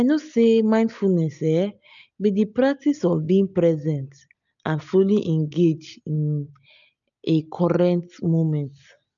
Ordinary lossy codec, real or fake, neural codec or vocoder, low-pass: none; real; none; 7.2 kHz